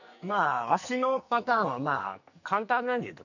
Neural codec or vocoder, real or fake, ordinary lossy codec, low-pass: codec, 44.1 kHz, 2.6 kbps, SNAC; fake; none; 7.2 kHz